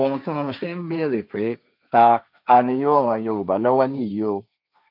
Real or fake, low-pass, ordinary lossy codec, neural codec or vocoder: fake; 5.4 kHz; none; codec, 16 kHz, 1.1 kbps, Voila-Tokenizer